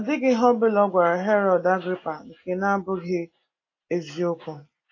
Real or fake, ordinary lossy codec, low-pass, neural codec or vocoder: real; AAC, 32 kbps; 7.2 kHz; none